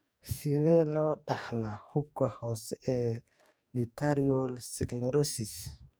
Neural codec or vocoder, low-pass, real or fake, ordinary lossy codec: codec, 44.1 kHz, 2.6 kbps, DAC; none; fake; none